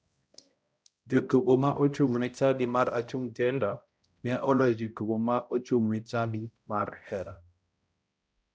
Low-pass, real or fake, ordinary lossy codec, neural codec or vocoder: none; fake; none; codec, 16 kHz, 0.5 kbps, X-Codec, HuBERT features, trained on balanced general audio